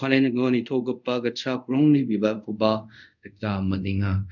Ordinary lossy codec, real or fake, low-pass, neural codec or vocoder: none; fake; 7.2 kHz; codec, 24 kHz, 0.5 kbps, DualCodec